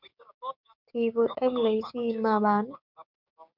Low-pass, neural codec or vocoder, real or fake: 5.4 kHz; codec, 16 kHz, 6 kbps, DAC; fake